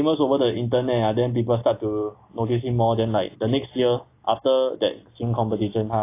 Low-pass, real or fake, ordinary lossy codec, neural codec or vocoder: 3.6 kHz; real; AAC, 24 kbps; none